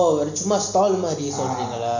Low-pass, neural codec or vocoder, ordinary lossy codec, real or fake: 7.2 kHz; none; none; real